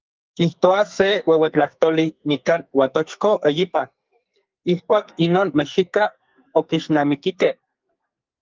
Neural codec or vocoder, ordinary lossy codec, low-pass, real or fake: codec, 44.1 kHz, 2.6 kbps, SNAC; Opus, 24 kbps; 7.2 kHz; fake